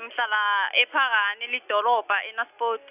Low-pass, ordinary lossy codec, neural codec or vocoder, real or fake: 3.6 kHz; none; none; real